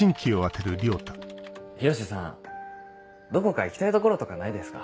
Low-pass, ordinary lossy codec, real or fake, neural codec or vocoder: none; none; real; none